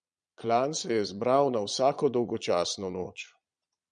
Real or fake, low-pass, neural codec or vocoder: fake; 9.9 kHz; vocoder, 22.05 kHz, 80 mel bands, Vocos